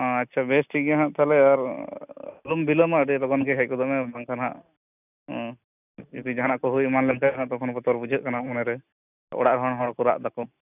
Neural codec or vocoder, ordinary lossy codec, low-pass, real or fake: none; none; 3.6 kHz; real